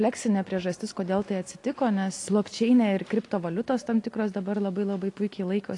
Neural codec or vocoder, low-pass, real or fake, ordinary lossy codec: none; 10.8 kHz; real; AAC, 48 kbps